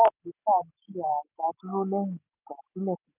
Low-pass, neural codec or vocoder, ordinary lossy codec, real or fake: 3.6 kHz; none; none; real